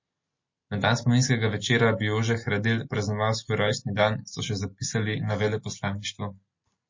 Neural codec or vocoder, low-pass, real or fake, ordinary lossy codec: none; 7.2 kHz; real; MP3, 32 kbps